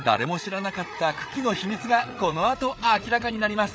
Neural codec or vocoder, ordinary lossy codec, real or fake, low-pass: codec, 16 kHz, 8 kbps, FreqCodec, larger model; none; fake; none